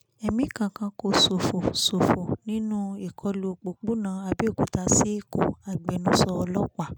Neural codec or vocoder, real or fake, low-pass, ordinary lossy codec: none; real; none; none